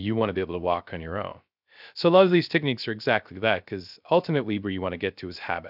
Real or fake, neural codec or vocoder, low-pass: fake; codec, 16 kHz, 0.3 kbps, FocalCodec; 5.4 kHz